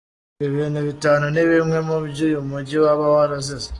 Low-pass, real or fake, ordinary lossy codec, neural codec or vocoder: 10.8 kHz; real; AAC, 32 kbps; none